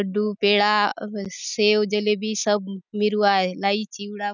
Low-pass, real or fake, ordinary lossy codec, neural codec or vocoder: 7.2 kHz; real; none; none